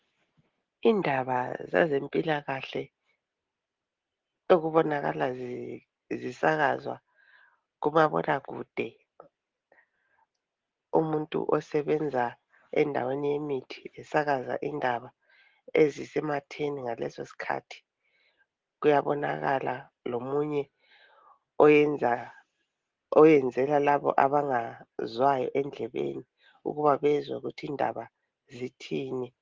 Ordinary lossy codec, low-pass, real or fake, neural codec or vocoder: Opus, 16 kbps; 7.2 kHz; real; none